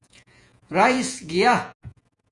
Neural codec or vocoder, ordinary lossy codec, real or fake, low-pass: vocoder, 48 kHz, 128 mel bands, Vocos; Opus, 64 kbps; fake; 10.8 kHz